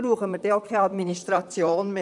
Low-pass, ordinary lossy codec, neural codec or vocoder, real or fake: 10.8 kHz; none; vocoder, 44.1 kHz, 128 mel bands, Pupu-Vocoder; fake